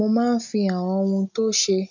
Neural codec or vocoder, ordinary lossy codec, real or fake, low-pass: none; none; real; 7.2 kHz